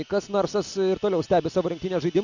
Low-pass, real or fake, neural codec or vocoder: 7.2 kHz; real; none